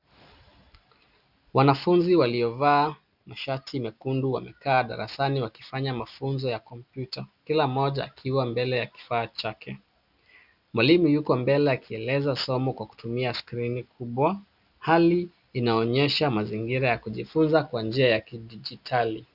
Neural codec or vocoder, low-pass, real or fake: none; 5.4 kHz; real